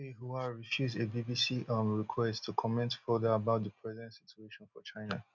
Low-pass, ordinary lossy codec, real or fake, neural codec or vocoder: 7.2 kHz; none; real; none